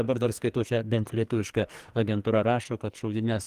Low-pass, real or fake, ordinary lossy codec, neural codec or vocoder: 14.4 kHz; fake; Opus, 24 kbps; codec, 44.1 kHz, 2.6 kbps, SNAC